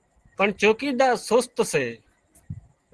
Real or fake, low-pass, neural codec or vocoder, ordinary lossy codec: real; 10.8 kHz; none; Opus, 16 kbps